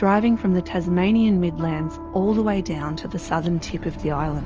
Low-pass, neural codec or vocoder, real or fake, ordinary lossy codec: 7.2 kHz; none; real; Opus, 24 kbps